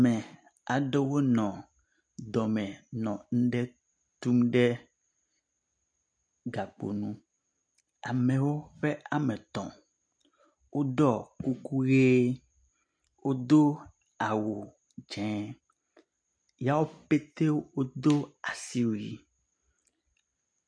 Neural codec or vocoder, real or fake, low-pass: none; real; 9.9 kHz